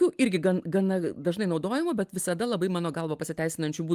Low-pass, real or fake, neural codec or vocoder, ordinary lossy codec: 14.4 kHz; real; none; Opus, 32 kbps